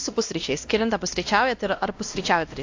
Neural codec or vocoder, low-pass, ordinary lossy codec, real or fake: codec, 16 kHz, 1 kbps, X-Codec, WavLM features, trained on Multilingual LibriSpeech; 7.2 kHz; AAC, 48 kbps; fake